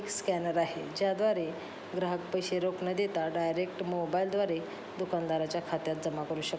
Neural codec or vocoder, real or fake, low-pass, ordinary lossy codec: none; real; none; none